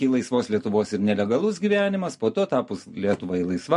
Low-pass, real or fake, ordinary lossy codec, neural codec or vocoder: 14.4 kHz; real; MP3, 48 kbps; none